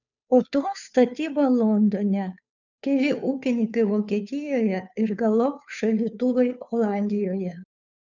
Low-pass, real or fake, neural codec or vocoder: 7.2 kHz; fake; codec, 16 kHz, 2 kbps, FunCodec, trained on Chinese and English, 25 frames a second